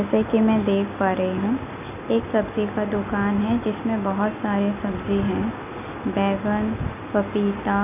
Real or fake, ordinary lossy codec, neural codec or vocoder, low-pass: real; none; none; 3.6 kHz